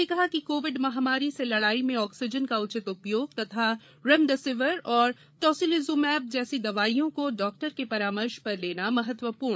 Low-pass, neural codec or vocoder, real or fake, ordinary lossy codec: none; codec, 16 kHz, 8 kbps, FreqCodec, larger model; fake; none